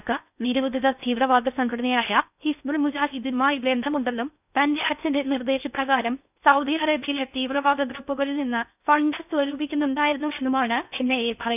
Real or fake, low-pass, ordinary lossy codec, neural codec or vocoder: fake; 3.6 kHz; none; codec, 16 kHz in and 24 kHz out, 0.6 kbps, FocalCodec, streaming, 4096 codes